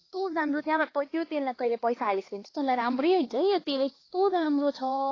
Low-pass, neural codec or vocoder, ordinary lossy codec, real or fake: 7.2 kHz; codec, 16 kHz, 2 kbps, X-Codec, HuBERT features, trained on LibriSpeech; AAC, 32 kbps; fake